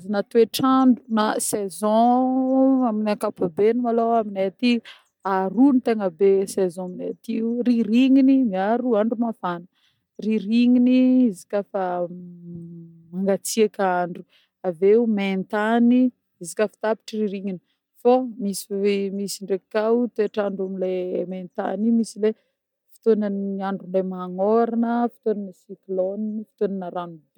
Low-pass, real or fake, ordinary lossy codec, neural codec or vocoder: 19.8 kHz; real; MP3, 96 kbps; none